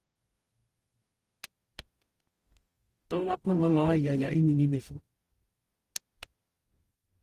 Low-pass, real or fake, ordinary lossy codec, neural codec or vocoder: 14.4 kHz; fake; Opus, 24 kbps; codec, 44.1 kHz, 0.9 kbps, DAC